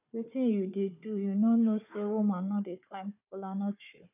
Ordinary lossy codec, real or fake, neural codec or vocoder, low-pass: none; fake; codec, 16 kHz, 4 kbps, FunCodec, trained on Chinese and English, 50 frames a second; 3.6 kHz